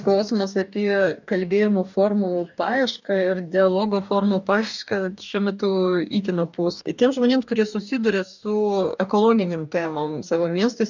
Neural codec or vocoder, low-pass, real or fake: codec, 44.1 kHz, 2.6 kbps, DAC; 7.2 kHz; fake